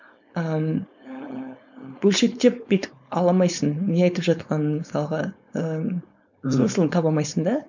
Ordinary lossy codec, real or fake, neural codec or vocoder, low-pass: none; fake; codec, 16 kHz, 4.8 kbps, FACodec; 7.2 kHz